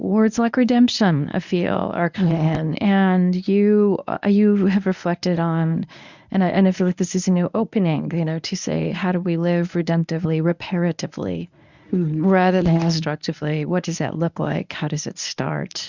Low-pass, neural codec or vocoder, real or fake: 7.2 kHz; codec, 24 kHz, 0.9 kbps, WavTokenizer, medium speech release version 1; fake